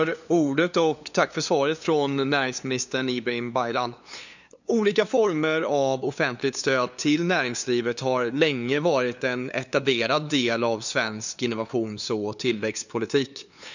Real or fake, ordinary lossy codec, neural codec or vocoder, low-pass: fake; none; codec, 16 kHz, 2 kbps, FunCodec, trained on LibriTTS, 25 frames a second; 7.2 kHz